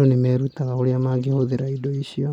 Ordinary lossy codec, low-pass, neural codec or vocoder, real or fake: none; 19.8 kHz; none; real